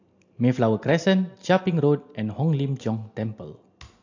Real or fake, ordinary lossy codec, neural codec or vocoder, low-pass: real; AAC, 48 kbps; none; 7.2 kHz